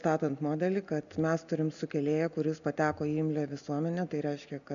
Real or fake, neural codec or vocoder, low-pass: real; none; 7.2 kHz